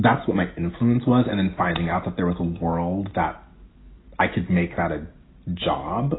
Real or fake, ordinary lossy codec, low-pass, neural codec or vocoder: real; AAC, 16 kbps; 7.2 kHz; none